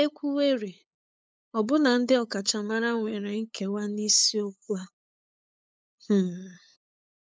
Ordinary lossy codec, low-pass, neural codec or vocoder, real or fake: none; none; codec, 16 kHz, 8 kbps, FunCodec, trained on LibriTTS, 25 frames a second; fake